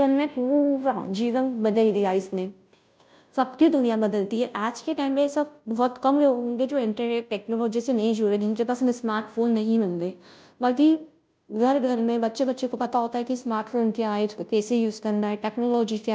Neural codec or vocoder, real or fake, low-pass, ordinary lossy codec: codec, 16 kHz, 0.5 kbps, FunCodec, trained on Chinese and English, 25 frames a second; fake; none; none